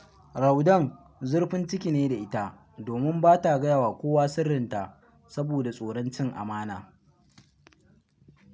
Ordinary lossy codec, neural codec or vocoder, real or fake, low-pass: none; none; real; none